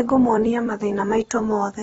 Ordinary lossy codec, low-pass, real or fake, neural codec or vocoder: AAC, 24 kbps; 19.8 kHz; fake; vocoder, 44.1 kHz, 128 mel bands every 256 samples, BigVGAN v2